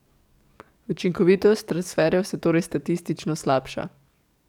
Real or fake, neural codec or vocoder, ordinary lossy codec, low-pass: fake; codec, 44.1 kHz, 7.8 kbps, DAC; none; 19.8 kHz